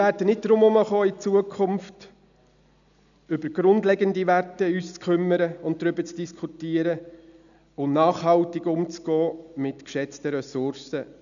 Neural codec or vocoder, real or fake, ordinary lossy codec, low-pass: none; real; none; 7.2 kHz